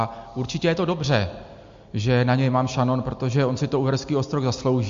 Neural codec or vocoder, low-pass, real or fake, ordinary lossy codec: none; 7.2 kHz; real; MP3, 48 kbps